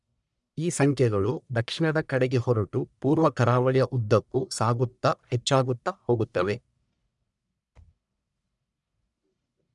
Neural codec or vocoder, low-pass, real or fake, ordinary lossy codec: codec, 44.1 kHz, 1.7 kbps, Pupu-Codec; 10.8 kHz; fake; none